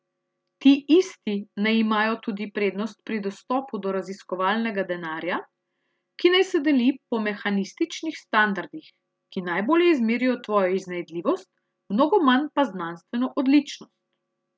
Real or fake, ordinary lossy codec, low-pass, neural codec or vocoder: real; none; none; none